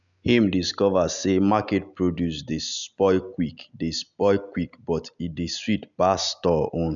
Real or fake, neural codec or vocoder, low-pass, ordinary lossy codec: real; none; 7.2 kHz; none